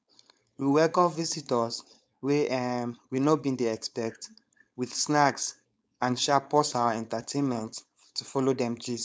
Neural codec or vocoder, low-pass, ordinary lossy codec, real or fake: codec, 16 kHz, 4.8 kbps, FACodec; none; none; fake